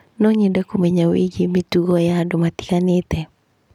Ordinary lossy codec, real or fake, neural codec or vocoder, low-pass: none; real; none; 19.8 kHz